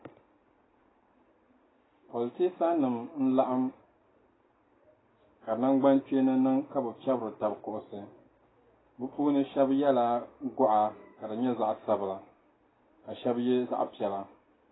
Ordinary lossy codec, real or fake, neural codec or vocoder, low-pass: AAC, 16 kbps; real; none; 7.2 kHz